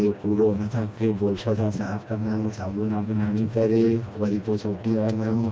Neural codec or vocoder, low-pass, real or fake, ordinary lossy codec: codec, 16 kHz, 1 kbps, FreqCodec, smaller model; none; fake; none